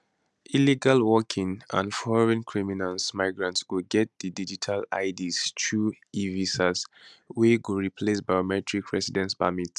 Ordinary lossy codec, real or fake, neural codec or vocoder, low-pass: none; real; none; none